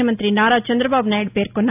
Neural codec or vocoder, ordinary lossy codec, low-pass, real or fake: none; AAC, 32 kbps; 3.6 kHz; real